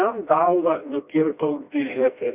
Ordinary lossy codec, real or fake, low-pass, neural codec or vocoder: Opus, 64 kbps; fake; 3.6 kHz; codec, 16 kHz, 1 kbps, FreqCodec, smaller model